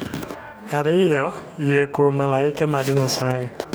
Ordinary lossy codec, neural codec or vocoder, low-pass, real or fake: none; codec, 44.1 kHz, 2.6 kbps, DAC; none; fake